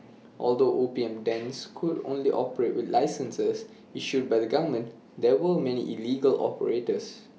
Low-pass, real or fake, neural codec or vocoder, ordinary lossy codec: none; real; none; none